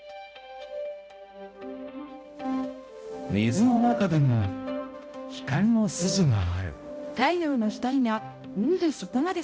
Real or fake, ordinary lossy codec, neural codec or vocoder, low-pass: fake; none; codec, 16 kHz, 0.5 kbps, X-Codec, HuBERT features, trained on balanced general audio; none